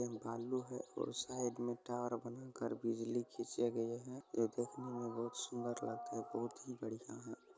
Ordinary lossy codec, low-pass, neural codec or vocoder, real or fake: none; none; none; real